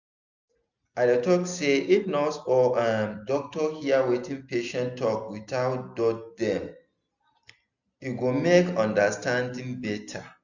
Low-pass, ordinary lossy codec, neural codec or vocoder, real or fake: 7.2 kHz; none; none; real